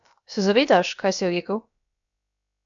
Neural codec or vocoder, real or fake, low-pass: codec, 16 kHz, about 1 kbps, DyCAST, with the encoder's durations; fake; 7.2 kHz